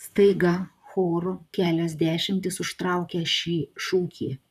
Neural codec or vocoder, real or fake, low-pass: vocoder, 44.1 kHz, 128 mel bands, Pupu-Vocoder; fake; 14.4 kHz